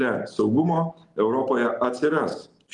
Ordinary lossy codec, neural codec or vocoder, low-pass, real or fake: Opus, 24 kbps; none; 10.8 kHz; real